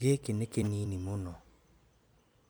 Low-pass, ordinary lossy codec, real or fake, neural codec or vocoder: none; none; fake; vocoder, 44.1 kHz, 128 mel bands every 256 samples, BigVGAN v2